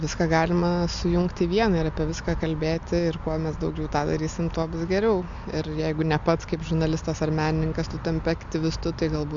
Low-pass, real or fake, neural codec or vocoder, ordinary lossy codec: 7.2 kHz; real; none; MP3, 64 kbps